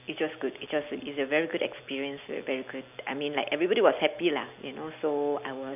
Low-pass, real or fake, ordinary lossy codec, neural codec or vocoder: 3.6 kHz; real; none; none